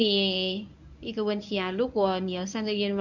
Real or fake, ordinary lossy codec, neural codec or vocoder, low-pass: fake; none; codec, 24 kHz, 0.9 kbps, WavTokenizer, medium speech release version 1; 7.2 kHz